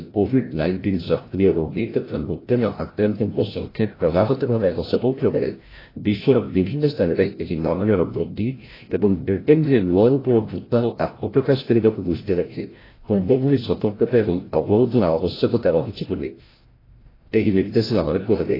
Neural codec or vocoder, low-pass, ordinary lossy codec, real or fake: codec, 16 kHz, 0.5 kbps, FreqCodec, larger model; 5.4 kHz; AAC, 24 kbps; fake